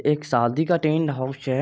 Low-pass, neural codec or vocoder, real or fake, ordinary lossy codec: none; none; real; none